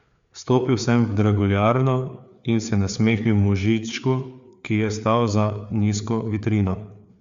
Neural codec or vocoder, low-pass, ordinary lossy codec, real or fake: codec, 16 kHz, 4 kbps, FreqCodec, larger model; 7.2 kHz; Opus, 64 kbps; fake